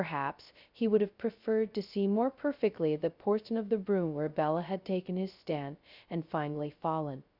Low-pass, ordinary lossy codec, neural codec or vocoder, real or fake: 5.4 kHz; Opus, 64 kbps; codec, 16 kHz, 0.2 kbps, FocalCodec; fake